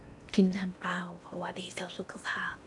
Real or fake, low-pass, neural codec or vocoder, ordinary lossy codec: fake; 10.8 kHz; codec, 16 kHz in and 24 kHz out, 0.8 kbps, FocalCodec, streaming, 65536 codes; none